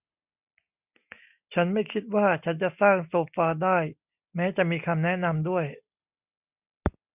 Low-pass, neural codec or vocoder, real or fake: 3.6 kHz; none; real